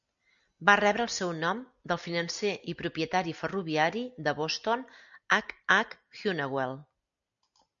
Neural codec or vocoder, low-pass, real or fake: none; 7.2 kHz; real